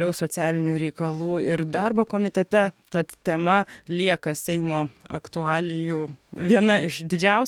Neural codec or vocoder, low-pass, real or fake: codec, 44.1 kHz, 2.6 kbps, DAC; 19.8 kHz; fake